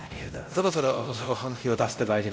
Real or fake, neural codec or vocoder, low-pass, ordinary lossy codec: fake; codec, 16 kHz, 0.5 kbps, X-Codec, WavLM features, trained on Multilingual LibriSpeech; none; none